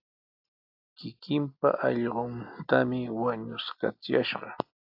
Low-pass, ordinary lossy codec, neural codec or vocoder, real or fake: 5.4 kHz; AAC, 48 kbps; none; real